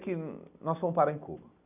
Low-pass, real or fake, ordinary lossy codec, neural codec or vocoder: 3.6 kHz; real; none; none